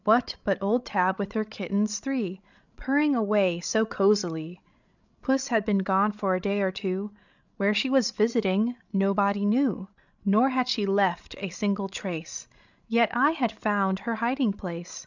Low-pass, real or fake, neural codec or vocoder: 7.2 kHz; fake; codec, 16 kHz, 16 kbps, FreqCodec, larger model